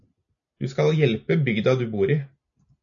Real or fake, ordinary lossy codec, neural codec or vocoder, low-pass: real; AAC, 48 kbps; none; 7.2 kHz